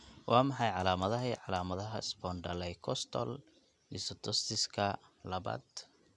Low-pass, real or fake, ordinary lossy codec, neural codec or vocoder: 10.8 kHz; real; none; none